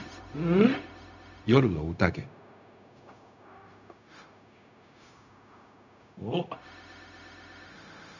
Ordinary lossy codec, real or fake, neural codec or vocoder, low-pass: none; fake; codec, 16 kHz, 0.4 kbps, LongCat-Audio-Codec; 7.2 kHz